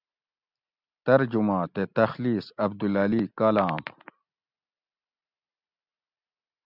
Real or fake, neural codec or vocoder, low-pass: fake; vocoder, 44.1 kHz, 128 mel bands every 256 samples, BigVGAN v2; 5.4 kHz